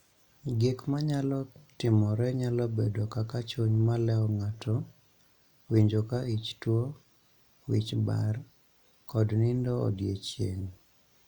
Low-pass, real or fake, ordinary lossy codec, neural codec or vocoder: 19.8 kHz; real; Opus, 64 kbps; none